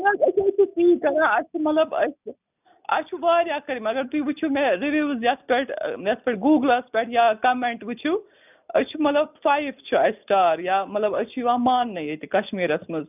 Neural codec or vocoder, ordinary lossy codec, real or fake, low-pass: none; none; real; 3.6 kHz